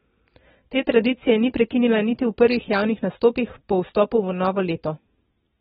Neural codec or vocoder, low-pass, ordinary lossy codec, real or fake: none; 7.2 kHz; AAC, 16 kbps; real